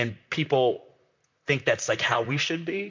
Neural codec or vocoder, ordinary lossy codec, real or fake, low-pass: vocoder, 44.1 kHz, 128 mel bands, Pupu-Vocoder; MP3, 64 kbps; fake; 7.2 kHz